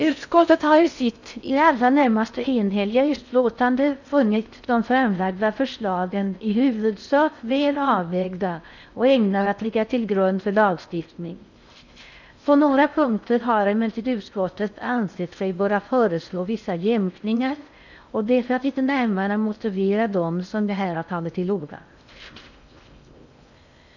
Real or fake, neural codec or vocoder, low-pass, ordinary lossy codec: fake; codec, 16 kHz in and 24 kHz out, 0.6 kbps, FocalCodec, streaming, 4096 codes; 7.2 kHz; none